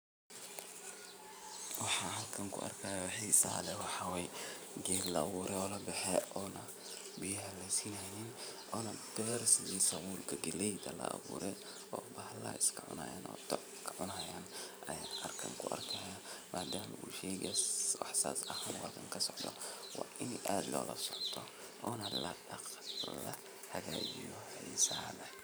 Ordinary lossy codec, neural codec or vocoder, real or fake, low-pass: none; vocoder, 44.1 kHz, 128 mel bands every 512 samples, BigVGAN v2; fake; none